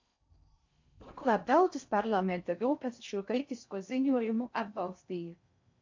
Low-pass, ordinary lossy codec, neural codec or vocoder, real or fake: 7.2 kHz; MP3, 48 kbps; codec, 16 kHz in and 24 kHz out, 0.6 kbps, FocalCodec, streaming, 4096 codes; fake